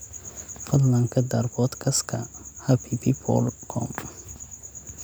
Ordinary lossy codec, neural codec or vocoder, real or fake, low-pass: none; none; real; none